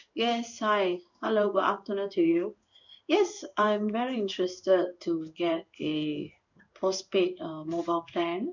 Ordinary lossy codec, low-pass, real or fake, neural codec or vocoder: none; 7.2 kHz; fake; codec, 16 kHz in and 24 kHz out, 1 kbps, XY-Tokenizer